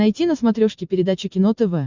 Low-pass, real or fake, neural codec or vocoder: 7.2 kHz; real; none